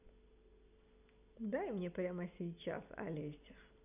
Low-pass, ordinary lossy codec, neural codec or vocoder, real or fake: 3.6 kHz; none; none; real